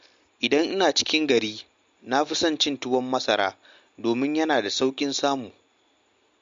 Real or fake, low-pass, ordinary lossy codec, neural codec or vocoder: real; 7.2 kHz; MP3, 48 kbps; none